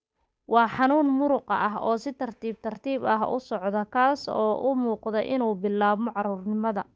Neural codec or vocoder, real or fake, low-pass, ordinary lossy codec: codec, 16 kHz, 8 kbps, FunCodec, trained on Chinese and English, 25 frames a second; fake; none; none